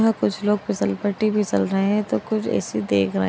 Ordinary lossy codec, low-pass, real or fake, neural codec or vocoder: none; none; real; none